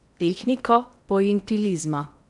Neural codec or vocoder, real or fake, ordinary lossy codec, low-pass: codec, 16 kHz in and 24 kHz out, 0.8 kbps, FocalCodec, streaming, 65536 codes; fake; none; 10.8 kHz